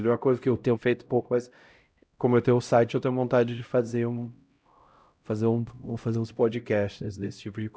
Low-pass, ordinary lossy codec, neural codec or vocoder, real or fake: none; none; codec, 16 kHz, 0.5 kbps, X-Codec, HuBERT features, trained on LibriSpeech; fake